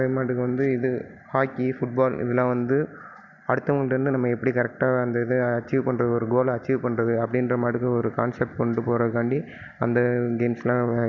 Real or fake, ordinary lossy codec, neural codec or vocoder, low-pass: real; none; none; 7.2 kHz